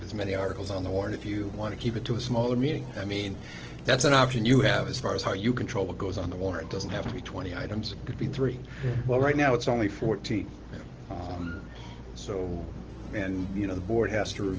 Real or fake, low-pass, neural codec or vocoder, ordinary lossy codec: real; 7.2 kHz; none; Opus, 16 kbps